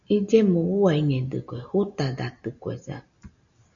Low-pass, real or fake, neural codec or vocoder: 7.2 kHz; real; none